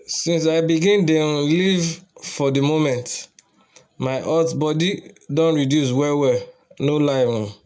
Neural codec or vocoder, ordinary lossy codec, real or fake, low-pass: none; none; real; none